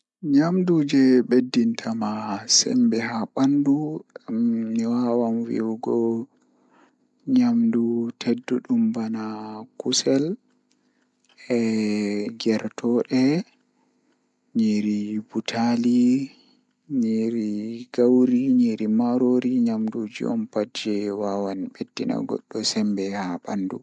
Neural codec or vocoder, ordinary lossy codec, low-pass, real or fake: none; MP3, 96 kbps; 10.8 kHz; real